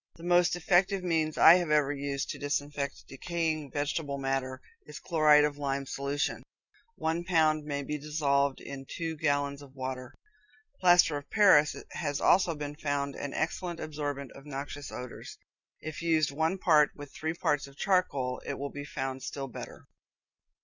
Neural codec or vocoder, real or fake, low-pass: none; real; 7.2 kHz